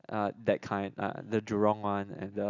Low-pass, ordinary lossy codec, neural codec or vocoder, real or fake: 7.2 kHz; none; none; real